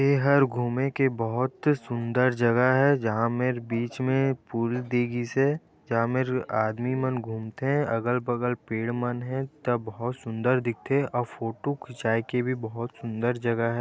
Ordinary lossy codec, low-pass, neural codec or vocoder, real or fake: none; none; none; real